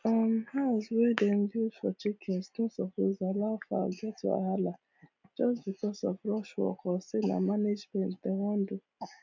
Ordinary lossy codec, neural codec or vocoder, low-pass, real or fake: MP3, 64 kbps; none; 7.2 kHz; real